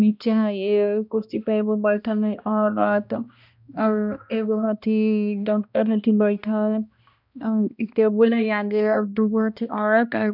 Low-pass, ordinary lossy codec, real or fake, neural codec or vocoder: 5.4 kHz; none; fake; codec, 16 kHz, 1 kbps, X-Codec, HuBERT features, trained on balanced general audio